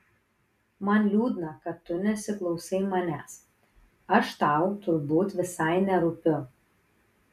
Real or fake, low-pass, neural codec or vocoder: real; 14.4 kHz; none